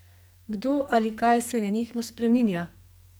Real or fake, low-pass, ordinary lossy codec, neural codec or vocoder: fake; none; none; codec, 44.1 kHz, 2.6 kbps, SNAC